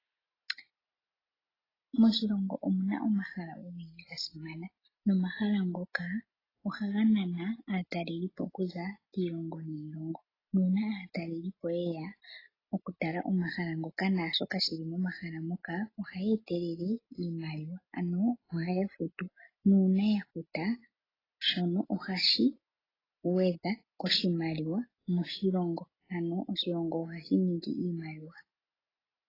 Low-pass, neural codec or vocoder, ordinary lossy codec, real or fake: 5.4 kHz; none; AAC, 24 kbps; real